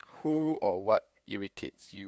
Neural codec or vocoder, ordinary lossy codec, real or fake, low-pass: codec, 16 kHz, 2 kbps, FunCodec, trained on LibriTTS, 25 frames a second; none; fake; none